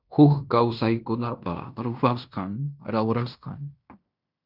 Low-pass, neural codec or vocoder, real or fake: 5.4 kHz; codec, 16 kHz in and 24 kHz out, 0.9 kbps, LongCat-Audio-Codec, fine tuned four codebook decoder; fake